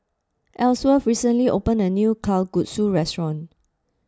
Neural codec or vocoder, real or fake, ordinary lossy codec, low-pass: none; real; none; none